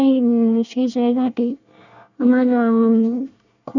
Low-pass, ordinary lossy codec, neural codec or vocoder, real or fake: 7.2 kHz; none; codec, 24 kHz, 1 kbps, SNAC; fake